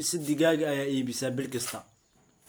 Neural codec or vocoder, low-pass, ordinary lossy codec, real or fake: none; none; none; real